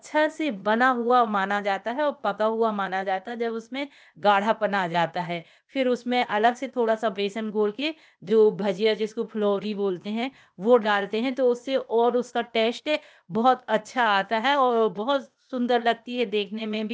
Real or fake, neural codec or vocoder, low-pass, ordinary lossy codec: fake; codec, 16 kHz, 0.8 kbps, ZipCodec; none; none